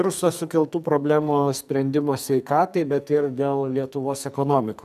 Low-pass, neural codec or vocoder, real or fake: 14.4 kHz; codec, 44.1 kHz, 2.6 kbps, SNAC; fake